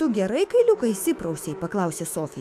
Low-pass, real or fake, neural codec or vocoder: 14.4 kHz; fake; autoencoder, 48 kHz, 128 numbers a frame, DAC-VAE, trained on Japanese speech